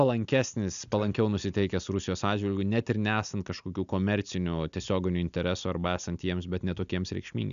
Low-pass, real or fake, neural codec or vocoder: 7.2 kHz; real; none